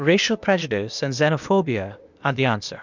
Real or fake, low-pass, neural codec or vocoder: fake; 7.2 kHz; codec, 16 kHz, 0.8 kbps, ZipCodec